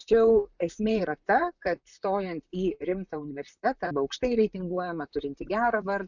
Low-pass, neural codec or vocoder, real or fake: 7.2 kHz; vocoder, 44.1 kHz, 128 mel bands, Pupu-Vocoder; fake